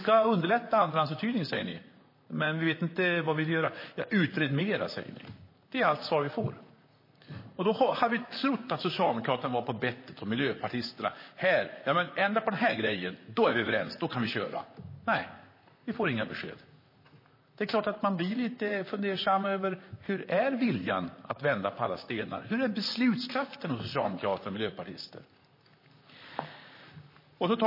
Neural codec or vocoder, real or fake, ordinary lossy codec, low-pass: vocoder, 44.1 kHz, 128 mel bands, Pupu-Vocoder; fake; MP3, 24 kbps; 5.4 kHz